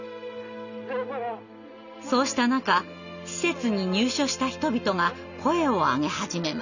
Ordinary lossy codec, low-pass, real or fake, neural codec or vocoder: none; 7.2 kHz; real; none